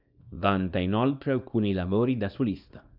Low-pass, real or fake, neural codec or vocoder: 5.4 kHz; fake; codec, 24 kHz, 0.9 kbps, WavTokenizer, small release